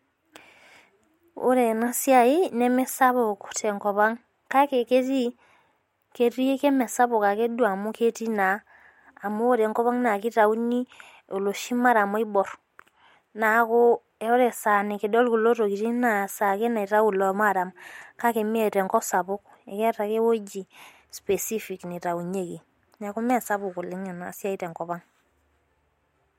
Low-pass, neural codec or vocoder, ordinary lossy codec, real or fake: 19.8 kHz; none; MP3, 64 kbps; real